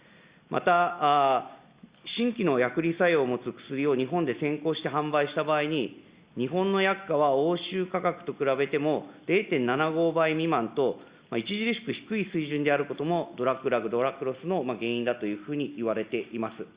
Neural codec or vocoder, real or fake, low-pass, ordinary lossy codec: none; real; 3.6 kHz; Opus, 64 kbps